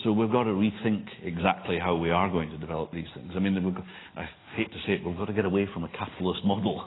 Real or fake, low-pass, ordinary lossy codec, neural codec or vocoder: real; 7.2 kHz; AAC, 16 kbps; none